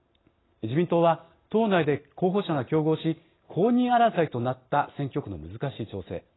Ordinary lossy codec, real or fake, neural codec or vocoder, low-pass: AAC, 16 kbps; real; none; 7.2 kHz